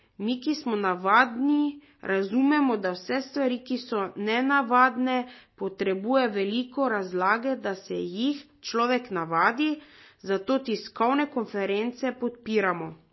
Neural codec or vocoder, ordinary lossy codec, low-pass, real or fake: none; MP3, 24 kbps; 7.2 kHz; real